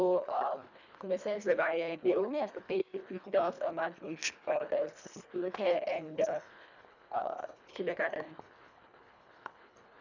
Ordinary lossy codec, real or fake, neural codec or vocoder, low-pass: none; fake; codec, 24 kHz, 1.5 kbps, HILCodec; 7.2 kHz